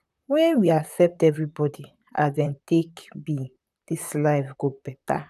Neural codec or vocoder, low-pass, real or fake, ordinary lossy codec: vocoder, 44.1 kHz, 128 mel bands, Pupu-Vocoder; 14.4 kHz; fake; none